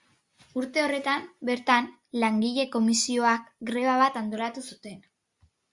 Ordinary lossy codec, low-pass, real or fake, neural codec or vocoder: Opus, 64 kbps; 10.8 kHz; real; none